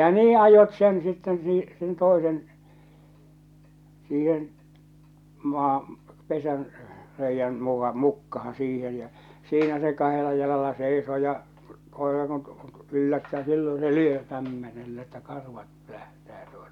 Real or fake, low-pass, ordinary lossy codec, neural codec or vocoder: real; 19.8 kHz; none; none